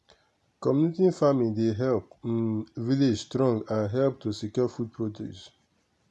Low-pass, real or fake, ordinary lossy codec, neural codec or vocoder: none; real; none; none